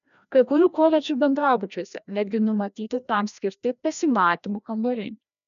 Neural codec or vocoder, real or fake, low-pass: codec, 16 kHz, 1 kbps, FreqCodec, larger model; fake; 7.2 kHz